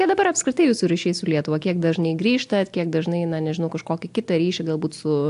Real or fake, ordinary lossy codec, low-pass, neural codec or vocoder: real; AAC, 64 kbps; 10.8 kHz; none